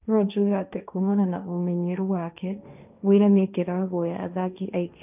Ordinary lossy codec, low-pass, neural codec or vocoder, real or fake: none; 3.6 kHz; codec, 16 kHz, 1.1 kbps, Voila-Tokenizer; fake